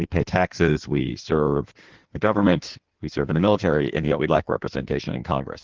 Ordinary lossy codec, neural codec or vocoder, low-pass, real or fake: Opus, 16 kbps; codec, 16 kHz in and 24 kHz out, 1.1 kbps, FireRedTTS-2 codec; 7.2 kHz; fake